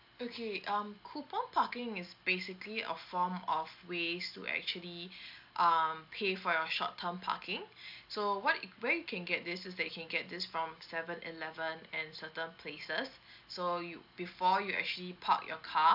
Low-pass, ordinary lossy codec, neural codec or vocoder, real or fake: 5.4 kHz; AAC, 48 kbps; none; real